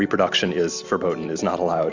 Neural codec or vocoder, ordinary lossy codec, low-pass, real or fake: none; Opus, 64 kbps; 7.2 kHz; real